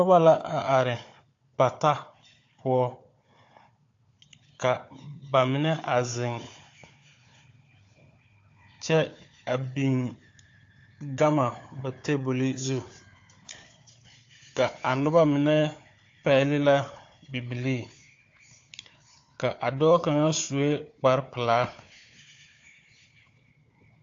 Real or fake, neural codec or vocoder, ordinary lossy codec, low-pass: fake; codec, 16 kHz, 4 kbps, FunCodec, trained on Chinese and English, 50 frames a second; AAC, 48 kbps; 7.2 kHz